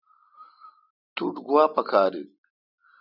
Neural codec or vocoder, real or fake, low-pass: none; real; 5.4 kHz